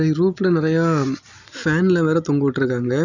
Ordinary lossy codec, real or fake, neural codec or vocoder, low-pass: none; real; none; 7.2 kHz